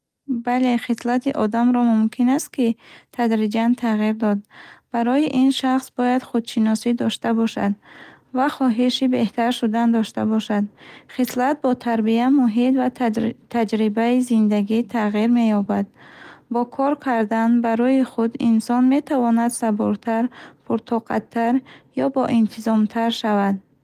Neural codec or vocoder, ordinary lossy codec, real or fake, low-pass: none; Opus, 32 kbps; real; 14.4 kHz